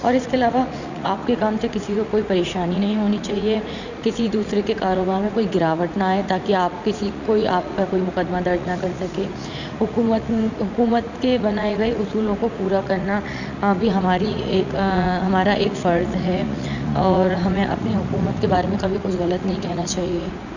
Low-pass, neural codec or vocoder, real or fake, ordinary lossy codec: 7.2 kHz; vocoder, 44.1 kHz, 80 mel bands, Vocos; fake; none